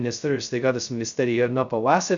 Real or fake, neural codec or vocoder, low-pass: fake; codec, 16 kHz, 0.2 kbps, FocalCodec; 7.2 kHz